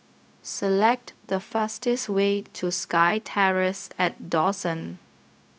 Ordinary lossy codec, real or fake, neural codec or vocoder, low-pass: none; fake; codec, 16 kHz, 0.4 kbps, LongCat-Audio-Codec; none